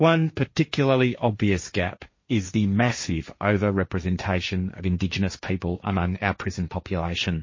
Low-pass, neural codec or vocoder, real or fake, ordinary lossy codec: 7.2 kHz; codec, 16 kHz, 1.1 kbps, Voila-Tokenizer; fake; MP3, 32 kbps